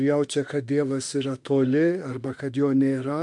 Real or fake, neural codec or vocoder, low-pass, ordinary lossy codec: fake; autoencoder, 48 kHz, 32 numbers a frame, DAC-VAE, trained on Japanese speech; 10.8 kHz; MP3, 64 kbps